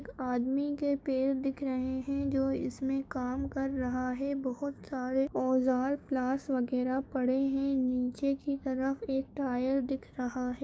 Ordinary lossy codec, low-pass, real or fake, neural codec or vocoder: none; none; fake; codec, 16 kHz, 6 kbps, DAC